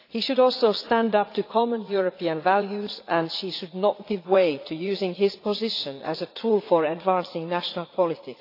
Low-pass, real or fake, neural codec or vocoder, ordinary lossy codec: 5.4 kHz; fake; vocoder, 44.1 kHz, 80 mel bands, Vocos; AAC, 32 kbps